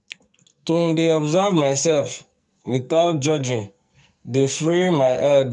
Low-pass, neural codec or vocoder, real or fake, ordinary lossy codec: 10.8 kHz; codec, 44.1 kHz, 2.6 kbps, SNAC; fake; none